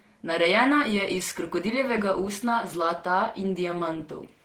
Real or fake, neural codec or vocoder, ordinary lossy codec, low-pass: fake; vocoder, 48 kHz, 128 mel bands, Vocos; Opus, 16 kbps; 19.8 kHz